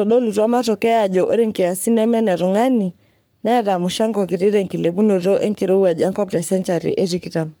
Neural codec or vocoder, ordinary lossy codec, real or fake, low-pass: codec, 44.1 kHz, 3.4 kbps, Pupu-Codec; none; fake; none